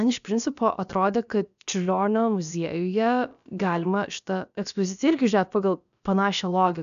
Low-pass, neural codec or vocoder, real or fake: 7.2 kHz; codec, 16 kHz, about 1 kbps, DyCAST, with the encoder's durations; fake